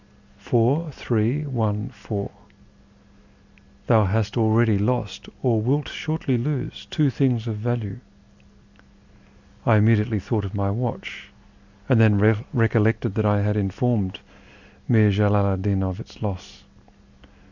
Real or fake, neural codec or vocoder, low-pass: real; none; 7.2 kHz